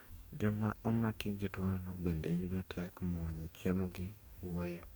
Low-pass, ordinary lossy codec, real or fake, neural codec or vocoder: none; none; fake; codec, 44.1 kHz, 2.6 kbps, DAC